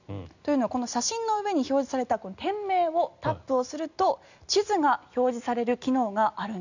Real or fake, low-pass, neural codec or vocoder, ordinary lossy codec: real; 7.2 kHz; none; none